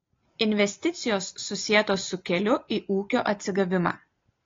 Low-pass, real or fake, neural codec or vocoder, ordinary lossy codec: 7.2 kHz; real; none; AAC, 32 kbps